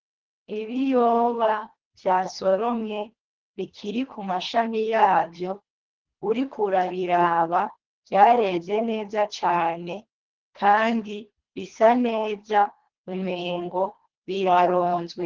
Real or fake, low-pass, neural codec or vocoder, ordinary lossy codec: fake; 7.2 kHz; codec, 24 kHz, 1.5 kbps, HILCodec; Opus, 16 kbps